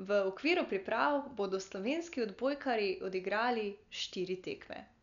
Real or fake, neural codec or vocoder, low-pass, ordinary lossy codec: real; none; 7.2 kHz; none